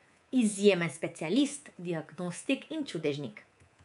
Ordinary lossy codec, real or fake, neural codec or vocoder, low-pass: none; fake; codec, 24 kHz, 3.1 kbps, DualCodec; 10.8 kHz